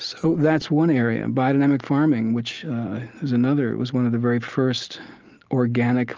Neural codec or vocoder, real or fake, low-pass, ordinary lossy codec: none; real; 7.2 kHz; Opus, 24 kbps